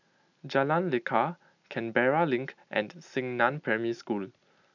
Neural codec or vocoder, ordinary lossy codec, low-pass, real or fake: none; none; 7.2 kHz; real